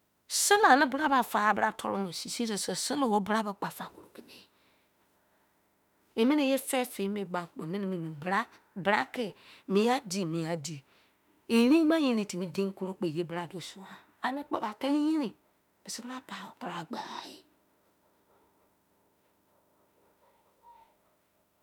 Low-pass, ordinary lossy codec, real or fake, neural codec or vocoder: 19.8 kHz; none; fake; autoencoder, 48 kHz, 32 numbers a frame, DAC-VAE, trained on Japanese speech